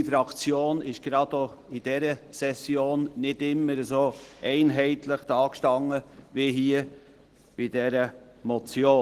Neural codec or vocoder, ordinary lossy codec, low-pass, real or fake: none; Opus, 16 kbps; 14.4 kHz; real